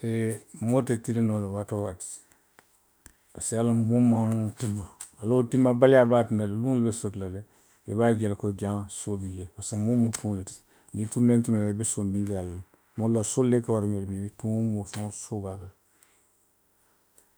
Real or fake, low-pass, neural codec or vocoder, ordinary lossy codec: fake; none; autoencoder, 48 kHz, 32 numbers a frame, DAC-VAE, trained on Japanese speech; none